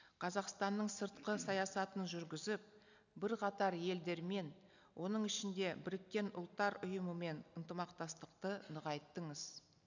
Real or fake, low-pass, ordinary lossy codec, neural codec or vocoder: real; 7.2 kHz; none; none